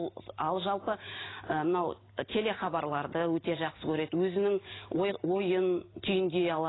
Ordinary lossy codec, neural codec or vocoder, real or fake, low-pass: AAC, 16 kbps; none; real; 7.2 kHz